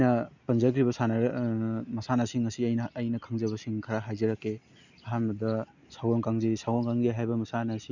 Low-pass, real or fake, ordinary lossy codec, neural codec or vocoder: 7.2 kHz; real; none; none